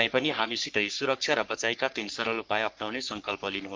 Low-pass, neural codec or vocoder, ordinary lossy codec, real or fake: 7.2 kHz; codec, 44.1 kHz, 3.4 kbps, Pupu-Codec; Opus, 32 kbps; fake